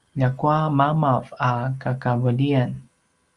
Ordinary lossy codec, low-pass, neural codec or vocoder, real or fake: Opus, 32 kbps; 10.8 kHz; none; real